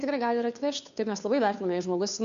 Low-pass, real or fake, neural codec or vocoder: 7.2 kHz; fake; codec, 16 kHz, 2 kbps, FunCodec, trained on Chinese and English, 25 frames a second